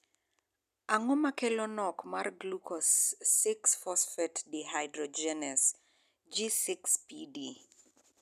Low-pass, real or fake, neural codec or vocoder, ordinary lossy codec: 14.4 kHz; real; none; none